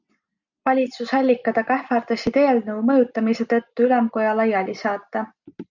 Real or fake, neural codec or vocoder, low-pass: real; none; 7.2 kHz